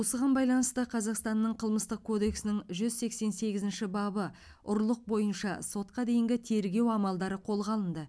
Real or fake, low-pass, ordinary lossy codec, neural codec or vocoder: real; none; none; none